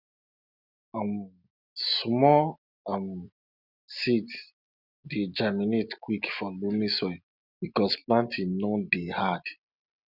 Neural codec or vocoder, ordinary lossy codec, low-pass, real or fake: none; none; 5.4 kHz; real